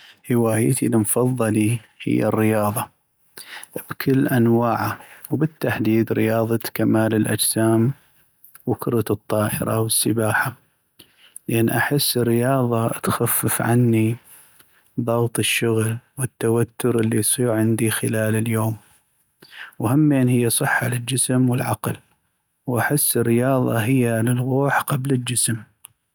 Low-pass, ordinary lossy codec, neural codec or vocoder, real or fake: none; none; none; real